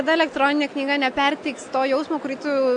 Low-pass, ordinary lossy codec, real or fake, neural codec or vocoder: 9.9 kHz; AAC, 64 kbps; real; none